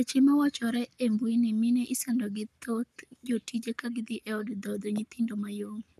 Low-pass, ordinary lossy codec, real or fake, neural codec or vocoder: 14.4 kHz; none; fake; codec, 44.1 kHz, 7.8 kbps, Pupu-Codec